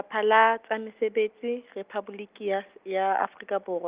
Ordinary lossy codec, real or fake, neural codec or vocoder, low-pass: Opus, 24 kbps; real; none; 3.6 kHz